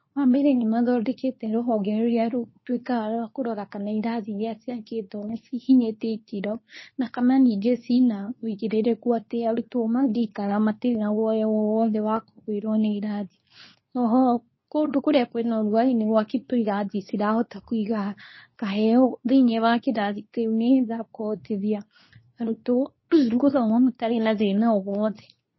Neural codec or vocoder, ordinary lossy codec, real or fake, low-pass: codec, 24 kHz, 0.9 kbps, WavTokenizer, medium speech release version 2; MP3, 24 kbps; fake; 7.2 kHz